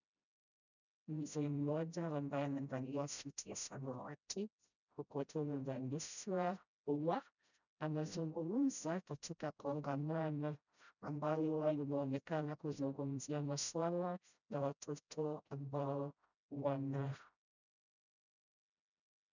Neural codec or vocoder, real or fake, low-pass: codec, 16 kHz, 0.5 kbps, FreqCodec, smaller model; fake; 7.2 kHz